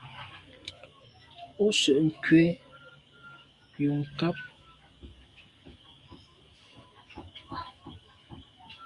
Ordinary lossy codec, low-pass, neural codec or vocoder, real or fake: Opus, 64 kbps; 10.8 kHz; codec, 44.1 kHz, 7.8 kbps, DAC; fake